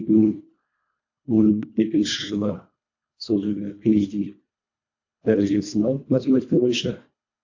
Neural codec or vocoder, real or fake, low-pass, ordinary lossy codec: codec, 24 kHz, 1.5 kbps, HILCodec; fake; 7.2 kHz; AAC, 48 kbps